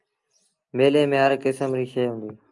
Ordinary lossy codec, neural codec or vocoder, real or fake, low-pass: Opus, 32 kbps; none; real; 10.8 kHz